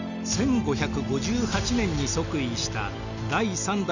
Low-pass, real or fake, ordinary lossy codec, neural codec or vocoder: 7.2 kHz; real; none; none